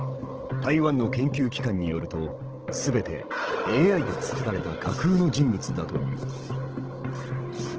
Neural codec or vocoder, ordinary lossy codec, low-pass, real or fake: codec, 16 kHz, 16 kbps, FunCodec, trained on Chinese and English, 50 frames a second; Opus, 16 kbps; 7.2 kHz; fake